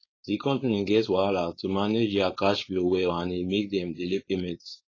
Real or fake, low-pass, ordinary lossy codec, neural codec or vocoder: fake; 7.2 kHz; AAC, 32 kbps; codec, 16 kHz, 4.8 kbps, FACodec